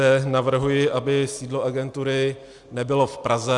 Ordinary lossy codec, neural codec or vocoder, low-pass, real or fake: Opus, 64 kbps; none; 10.8 kHz; real